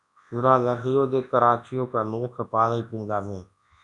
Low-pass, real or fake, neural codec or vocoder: 10.8 kHz; fake; codec, 24 kHz, 0.9 kbps, WavTokenizer, large speech release